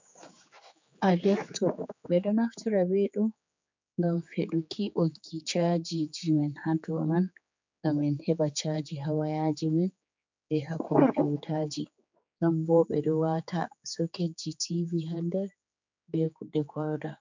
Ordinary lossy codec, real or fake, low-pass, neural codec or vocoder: MP3, 64 kbps; fake; 7.2 kHz; codec, 16 kHz, 4 kbps, X-Codec, HuBERT features, trained on general audio